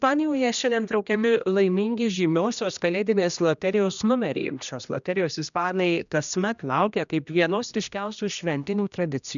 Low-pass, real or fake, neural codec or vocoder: 7.2 kHz; fake; codec, 16 kHz, 1 kbps, X-Codec, HuBERT features, trained on general audio